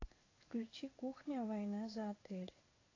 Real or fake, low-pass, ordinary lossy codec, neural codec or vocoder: fake; 7.2 kHz; AAC, 32 kbps; codec, 16 kHz in and 24 kHz out, 1 kbps, XY-Tokenizer